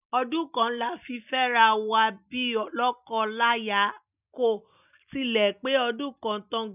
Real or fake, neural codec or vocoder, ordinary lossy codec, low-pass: real; none; none; 3.6 kHz